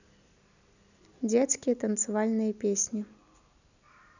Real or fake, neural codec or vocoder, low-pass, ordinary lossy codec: real; none; 7.2 kHz; none